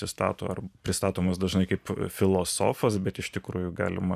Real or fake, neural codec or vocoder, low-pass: fake; vocoder, 44.1 kHz, 128 mel bands every 512 samples, BigVGAN v2; 14.4 kHz